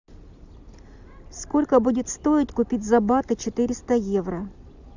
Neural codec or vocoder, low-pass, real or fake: none; 7.2 kHz; real